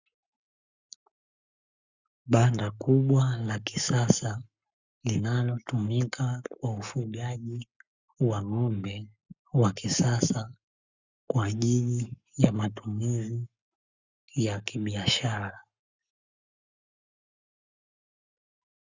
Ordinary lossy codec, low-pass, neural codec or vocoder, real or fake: Opus, 64 kbps; 7.2 kHz; codec, 44.1 kHz, 7.8 kbps, Pupu-Codec; fake